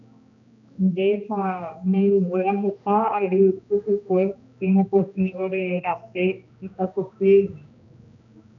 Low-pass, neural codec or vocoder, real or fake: 7.2 kHz; codec, 16 kHz, 1 kbps, X-Codec, HuBERT features, trained on general audio; fake